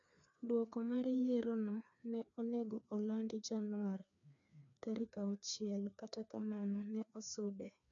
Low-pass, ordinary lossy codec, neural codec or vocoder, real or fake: 7.2 kHz; none; codec, 16 kHz, 2 kbps, FreqCodec, larger model; fake